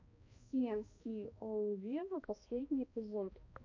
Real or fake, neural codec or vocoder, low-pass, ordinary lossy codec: fake; codec, 16 kHz, 1 kbps, X-Codec, HuBERT features, trained on balanced general audio; 7.2 kHz; MP3, 48 kbps